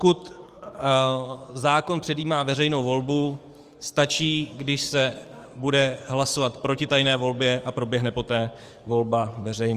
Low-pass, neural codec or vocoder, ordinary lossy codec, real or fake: 14.4 kHz; codec, 44.1 kHz, 7.8 kbps, DAC; Opus, 32 kbps; fake